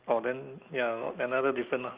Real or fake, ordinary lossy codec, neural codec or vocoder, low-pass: real; Opus, 24 kbps; none; 3.6 kHz